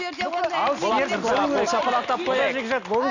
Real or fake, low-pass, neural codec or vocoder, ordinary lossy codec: real; 7.2 kHz; none; none